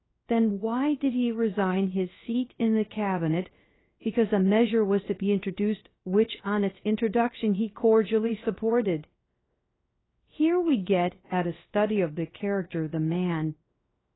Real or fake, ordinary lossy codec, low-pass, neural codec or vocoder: fake; AAC, 16 kbps; 7.2 kHz; codec, 16 kHz, 0.3 kbps, FocalCodec